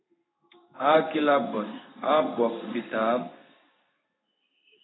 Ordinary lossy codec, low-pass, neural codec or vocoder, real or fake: AAC, 16 kbps; 7.2 kHz; codec, 16 kHz in and 24 kHz out, 1 kbps, XY-Tokenizer; fake